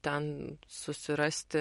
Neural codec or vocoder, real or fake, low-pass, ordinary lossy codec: none; real; 19.8 kHz; MP3, 48 kbps